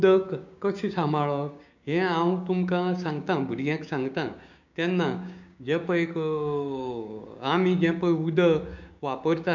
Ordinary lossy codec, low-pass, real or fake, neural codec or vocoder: none; 7.2 kHz; fake; codec, 16 kHz, 6 kbps, DAC